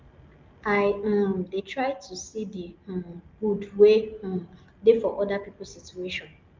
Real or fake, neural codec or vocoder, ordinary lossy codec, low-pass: real; none; Opus, 24 kbps; 7.2 kHz